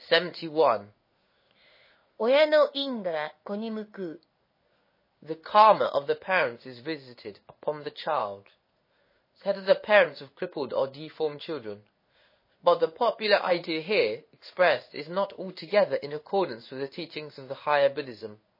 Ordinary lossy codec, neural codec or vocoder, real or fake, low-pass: MP3, 24 kbps; codec, 16 kHz in and 24 kHz out, 1 kbps, XY-Tokenizer; fake; 5.4 kHz